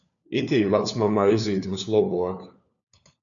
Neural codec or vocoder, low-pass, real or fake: codec, 16 kHz, 4 kbps, FunCodec, trained on LibriTTS, 50 frames a second; 7.2 kHz; fake